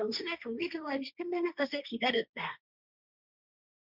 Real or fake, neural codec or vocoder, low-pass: fake; codec, 16 kHz, 1.1 kbps, Voila-Tokenizer; 5.4 kHz